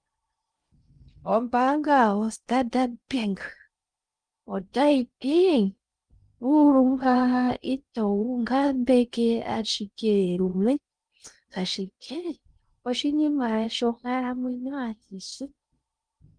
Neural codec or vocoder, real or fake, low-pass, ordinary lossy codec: codec, 16 kHz in and 24 kHz out, 0.6 kbps, FocalCodec, streaming, 2048 codes; fake; 9.9 kHz; Opus, 32 kbps